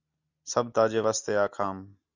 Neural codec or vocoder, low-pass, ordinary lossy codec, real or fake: none; 7.2 kHz; Opus, 64 kbps; real